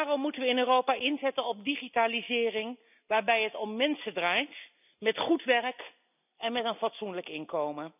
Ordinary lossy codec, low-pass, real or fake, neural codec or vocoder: none; 3.6 kHz; real; none